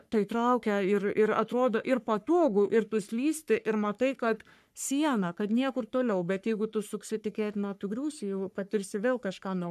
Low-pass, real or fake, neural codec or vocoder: 14.4 kHz; fake; codec, 44.1 kHz, 3.4 kbps, Pupu-Codec